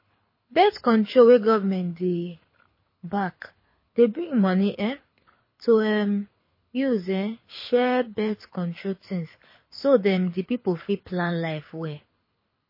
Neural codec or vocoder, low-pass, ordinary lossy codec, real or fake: codec, 24 kHz, 6 kbps, HILCodec; 5.4 kHz; MP3, 24 kbps; fake